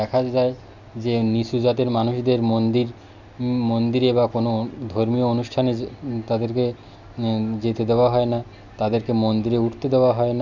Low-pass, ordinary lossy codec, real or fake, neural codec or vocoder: 7.2 kHz; none; real; none